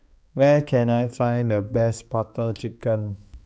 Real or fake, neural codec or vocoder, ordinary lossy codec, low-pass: fake; codec, 16 kHz, 2 kbps, X-Codec, HuBERT features, trained on balanced general audio; none; none